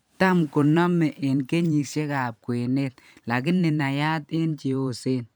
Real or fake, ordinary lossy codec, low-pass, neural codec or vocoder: fake; none; none; vocoder, 44.1 kHz, 128 mel bands every 512 samples, BigVGAN v2